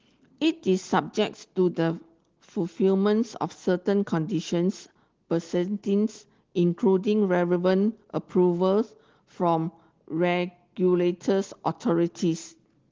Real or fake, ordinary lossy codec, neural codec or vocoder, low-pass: real; Opus, 16 kbps; none; 7.2 kHz